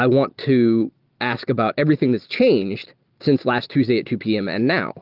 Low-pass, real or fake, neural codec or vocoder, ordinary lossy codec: 5.4 kHz; real; none; Opus, 32 kbps